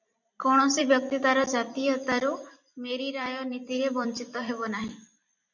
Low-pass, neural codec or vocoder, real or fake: 7.2 kHz; vocoder, 44.1 kHz, 128 mel bands every 256 samples, BigVGAN v2; fake